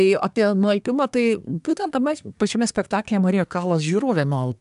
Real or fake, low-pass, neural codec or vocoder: fake; 10.8 kHz; codec, 24 kHz, 1 kbps, SNAC